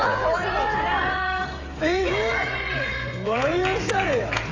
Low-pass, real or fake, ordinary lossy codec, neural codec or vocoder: 7.2 kHz; fake; AAC, 32 kbps; codec, 16 kHz, 16 kbps, FreqCodec, smaller model